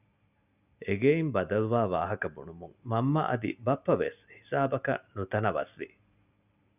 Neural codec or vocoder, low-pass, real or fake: none; 3.6 kHz; real